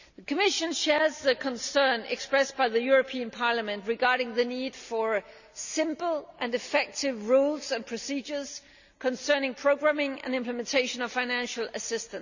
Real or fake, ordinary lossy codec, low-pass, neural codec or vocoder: real; none; 7.2 kHz; none